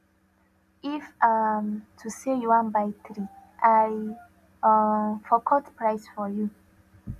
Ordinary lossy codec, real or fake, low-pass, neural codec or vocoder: none; real; 14.4 kHz; none